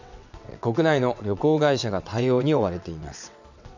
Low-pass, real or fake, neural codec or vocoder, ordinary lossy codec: 7.2 kHz; fake; vocoder, 22.05 kHz, 80 mel bands, WaveNeXt; none